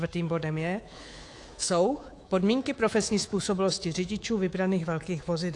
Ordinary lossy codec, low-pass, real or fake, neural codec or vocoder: AAC, 48 kbps; 10.8 kHz; fake; codec, 24 kHz, 3.1 kbps, DualCodec